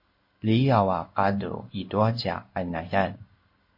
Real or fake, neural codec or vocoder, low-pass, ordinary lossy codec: fake; codec, 16 kHz in and 24 kHz out, 1 kbps, XY-Tokenizer; 5.4 kHz; MP3, 32 kbps